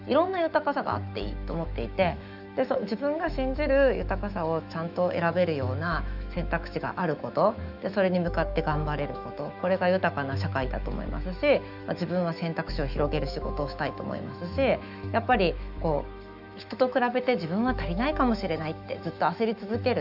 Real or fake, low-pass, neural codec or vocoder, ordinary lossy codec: fake; 5.4 kHz; autoencoder, 48 kHz, 128 numbers a frame, DAC-VAE, trained on Japanese speech; none